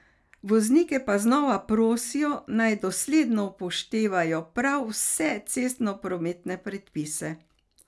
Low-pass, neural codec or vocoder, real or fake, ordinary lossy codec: none; none; real; none